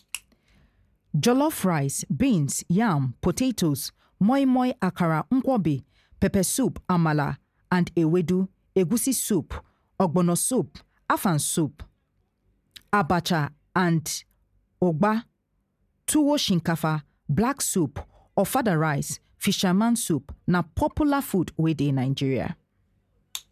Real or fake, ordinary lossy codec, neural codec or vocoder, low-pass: real; none; none; 14.4 kHz